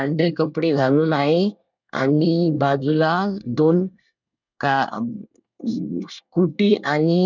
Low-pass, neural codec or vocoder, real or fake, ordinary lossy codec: 7.2 kHz; codec, 24 kHz, 1 kbps, SNAC; fake; none